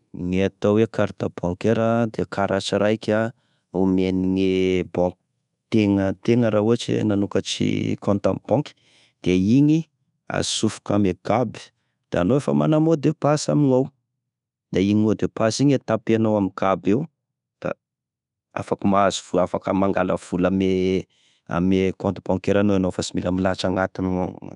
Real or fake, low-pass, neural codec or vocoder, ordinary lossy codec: fake; 10.8 kHz; codec, 24 kHz, 1.2 kbps, DualCodec; none